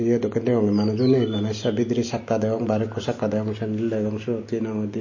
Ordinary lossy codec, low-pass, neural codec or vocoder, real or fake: MP3, 32 kbps; 7.2 kHz; none; real